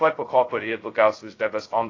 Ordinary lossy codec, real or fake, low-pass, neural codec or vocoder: AAC, 32 kbps; fake; 7.2 kHz; codec, 16 kHz, 0.2 kbps, FocalCodec